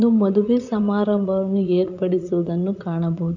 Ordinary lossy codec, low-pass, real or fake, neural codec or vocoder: none; 7.2 kHz; fake; vocoder, 44.1 kHz, 80 mel bands, Vocos